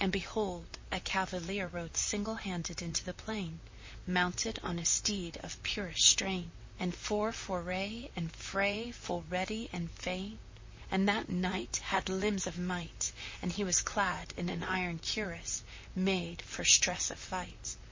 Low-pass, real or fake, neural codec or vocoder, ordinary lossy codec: 7.2 kHz; fake; vocoder, 44.1 kHz, 128 mel bands, Pupu-Vocoder; MP3, 32 kbps